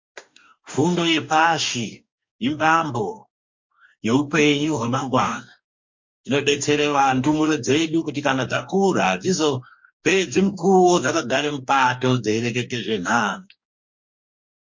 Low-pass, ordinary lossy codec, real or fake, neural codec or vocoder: 7.2 kHz; MP3, 48 kbps; fake; codec, 44.1 kHz, 2.6 kbps, DAC